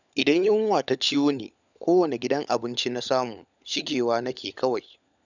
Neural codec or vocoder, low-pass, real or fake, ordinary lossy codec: codec, 16 kHz, 16 kbps, FunCodec, trained on LibriTTS, 50 frames a second; 7.2 kHz; fake; none